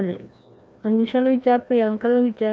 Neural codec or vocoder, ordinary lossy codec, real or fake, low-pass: codec, 16 kHz, 1 kbps, FreqCodec, larger model; none; fake; none